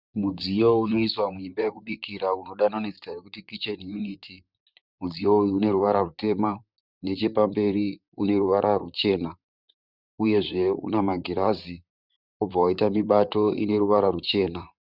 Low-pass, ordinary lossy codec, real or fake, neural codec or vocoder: 5.4 kHz; Opus, 64 kbps; fake; vocoder, 44.1 kHz, 128 mel bands, Pupu-Vocoder